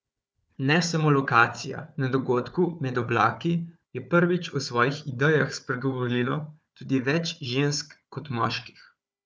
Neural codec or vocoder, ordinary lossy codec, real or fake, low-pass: codec, 16 kHz, 4 kbps, FunCodec, trained on Chinese and English, 50 frames a second; none; fake; none